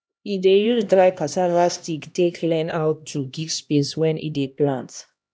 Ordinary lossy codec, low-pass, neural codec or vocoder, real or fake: none; none; codec, 16 kHz, 1 kbps, X-Codec, HuBERT features, trained on LibriSpeech; fake